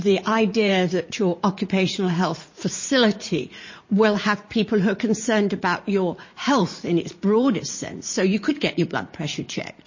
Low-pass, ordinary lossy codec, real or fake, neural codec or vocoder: 7.2 kHz; MP3, 32 kbps; fake; vocoder, 44.1 kHz, 80 mel bands, Vocos